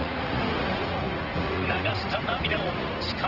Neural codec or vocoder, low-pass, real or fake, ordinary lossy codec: vocoder, 44.1 kHz, 80 mel bands, Vocos; 5.4 kHz; fake; Opus, 16 kbps